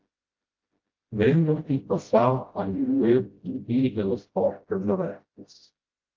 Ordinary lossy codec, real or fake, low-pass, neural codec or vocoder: Opus, 32 kbps; fake; 7.2 kHz; codec, 16 kHz, 0.5 kbps, FreqCodec, smaller model